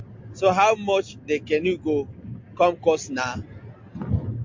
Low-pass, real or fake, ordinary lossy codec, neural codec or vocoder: 7.2 kHz; real; MP3, 48 kbps; none